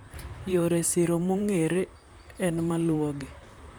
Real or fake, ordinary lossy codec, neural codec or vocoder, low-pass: fake; none; vocoder, 44.1 kHz, 128 mel bands, Pupu-Vocoder; none